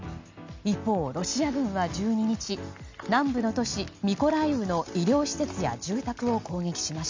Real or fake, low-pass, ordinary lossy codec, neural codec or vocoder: real; 7.2 kHz; MP3, 64 kbps; none